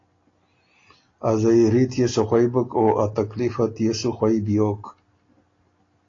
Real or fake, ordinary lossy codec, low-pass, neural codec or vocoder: real; AAC, 32 kbps; 7.2 kHz; none